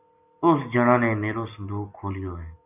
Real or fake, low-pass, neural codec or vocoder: real; 3.6 kHz; none